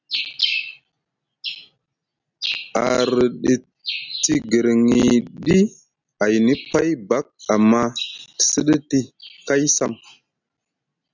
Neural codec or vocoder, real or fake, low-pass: none; real; 7.2 kHz